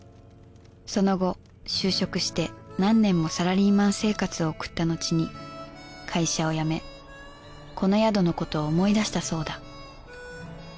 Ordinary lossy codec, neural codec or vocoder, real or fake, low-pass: none; none; real; none